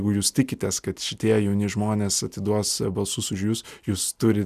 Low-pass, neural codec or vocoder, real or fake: 14.4 kHz; none; real